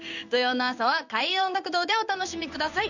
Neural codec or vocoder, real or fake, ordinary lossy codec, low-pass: codec, 16 kHz in and 24 kHz out, 1 kbps, XY-Tokenizer; fake; none; 7.2 kHz